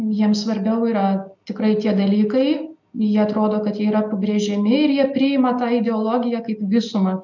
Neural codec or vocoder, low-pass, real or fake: none; 7.2 kHz; real